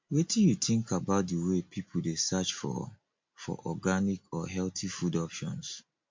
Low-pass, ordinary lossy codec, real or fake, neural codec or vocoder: 7.2 kHz; MP3, 48 kbps; real; none